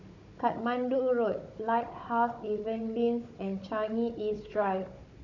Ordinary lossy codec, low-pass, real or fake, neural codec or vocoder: none; 7.2 kHz; fake; codec, 16 kHz, 16 kbps, FunCodec, trained on Chinese and English, 50 frames a second